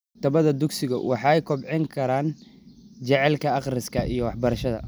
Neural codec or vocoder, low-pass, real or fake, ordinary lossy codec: none; none; real; none